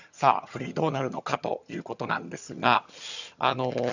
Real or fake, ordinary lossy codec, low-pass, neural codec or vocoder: fake; none; 7.2 kHz; vocoder, 22.05 kHz, 80 mel bands, HiFi-GAN